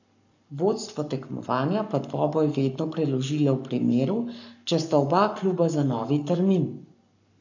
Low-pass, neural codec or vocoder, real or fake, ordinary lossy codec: 7.2 kHz; codec, 44.1 kHz, 7.8 kbps, Pupu-Codec; fake; none